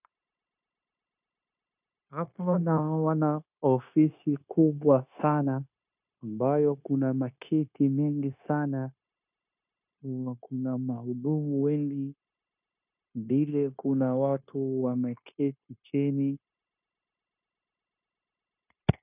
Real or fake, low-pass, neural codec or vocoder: fake; 3.6 kHz; codec, 16 kHz, 0.9 kbps, LongCat-Audio-Codec